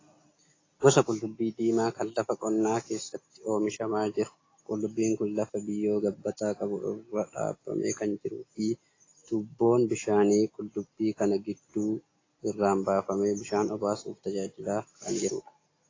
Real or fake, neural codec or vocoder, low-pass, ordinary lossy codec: real; none; 7.2 kHz; AAC, 32 kbps